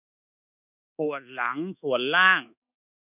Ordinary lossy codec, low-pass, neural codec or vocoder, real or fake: none; 3.6 kHz; codec, 24 kHz, 1.2 kbps, DualCodec; fake